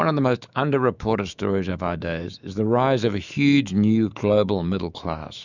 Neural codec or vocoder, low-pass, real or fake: autoencoder, 48 kHz, 128 numbers a frame, DAC-VAE, trained on Japanese speech; 7.2 kHz; fake